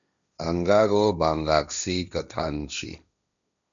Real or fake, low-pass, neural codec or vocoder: fake; 7.2 kHz; codec, 16 kHz, 1.1 kbps, Voila-Tokenizer